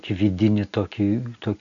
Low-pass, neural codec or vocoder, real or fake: 7.2 kHz; none; real